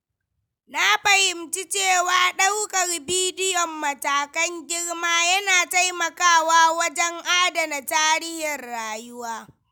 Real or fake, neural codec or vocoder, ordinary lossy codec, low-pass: real; none; none; none